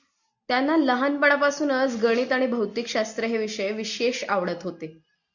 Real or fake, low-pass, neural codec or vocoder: real; 7.2 kHz; none